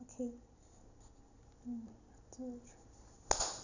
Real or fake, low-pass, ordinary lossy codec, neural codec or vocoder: real; 7.2 kHz; none; none